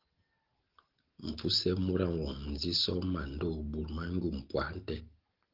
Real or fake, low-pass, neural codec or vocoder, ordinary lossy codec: real; 5.4 kHz; none; Opus, 16 kbps